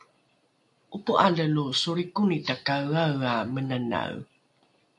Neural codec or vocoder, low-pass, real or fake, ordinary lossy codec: none; 10.8 kHz; real; AAC, 64 kbps